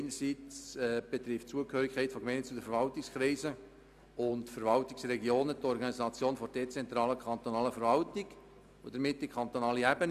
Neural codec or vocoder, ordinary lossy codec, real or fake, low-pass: none; none; real; 14.4 kHz